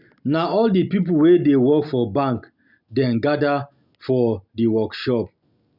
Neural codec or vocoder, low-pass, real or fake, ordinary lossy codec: none; 5.4 kHz; real; none